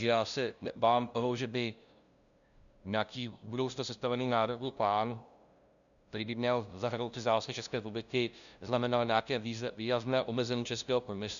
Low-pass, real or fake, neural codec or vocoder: 7.2 kHz; fake; codec, 16 kHz, 0.5 kbps, FunCodec, trained on LibriTTS, 25 frames a second